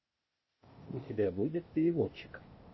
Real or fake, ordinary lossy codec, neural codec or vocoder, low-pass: fake; MP3, 24 kbps; codec, 16 kHz, 0.8 kbps, ZipCodec; 7.2 kHz